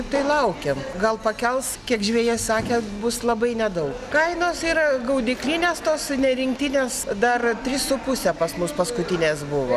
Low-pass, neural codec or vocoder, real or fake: 14.4 kHz; none; real